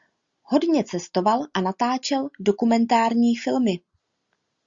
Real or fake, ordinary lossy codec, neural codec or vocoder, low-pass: real; Opus, 64 kbps; none; 7.2 kHz